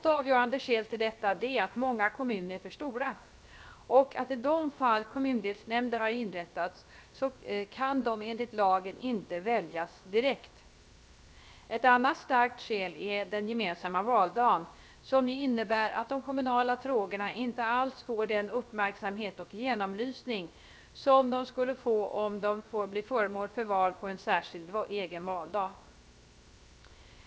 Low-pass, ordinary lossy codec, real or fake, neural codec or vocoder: none; none; fake; codec, 16 kHz, 0.7 kbps, FocalCodec